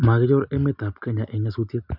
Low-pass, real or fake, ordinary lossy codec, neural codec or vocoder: 5.4 kHz; real; none; none